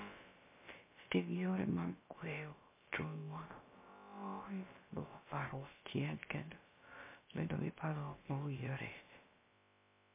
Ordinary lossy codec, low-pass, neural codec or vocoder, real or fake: MP3, 24 kbps; 3.6 kHz; codec, 16 kHz, about 1 kbps, DyCAST, with the encoder's durations; fake